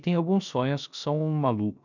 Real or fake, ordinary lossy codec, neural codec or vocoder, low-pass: fake; none; codec, 16 kHz, 0.3 kbps, FocalCodec; 7.2 kHz